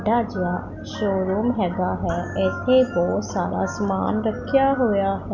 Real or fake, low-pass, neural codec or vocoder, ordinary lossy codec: real; 7.2 kHz; none; none